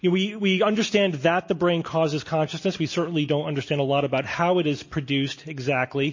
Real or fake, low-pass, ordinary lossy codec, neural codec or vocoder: real; 7.2 kHz; MP3, 32 kbps; none